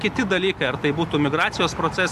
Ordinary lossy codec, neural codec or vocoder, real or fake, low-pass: Opus, 64 kbps; none; real; 14.4 kHz